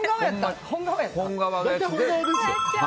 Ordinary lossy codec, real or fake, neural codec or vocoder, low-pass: none; real; none; none